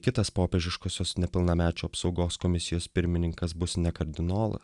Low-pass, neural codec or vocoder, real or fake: 10.8 kHz; none; real